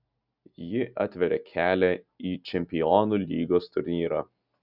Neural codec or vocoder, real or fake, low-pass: none; real; 5.4 kHz